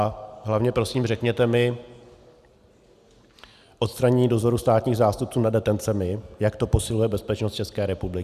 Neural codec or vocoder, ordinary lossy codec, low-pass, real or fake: none; Opus, 64 kbps; 14.4 kHz; real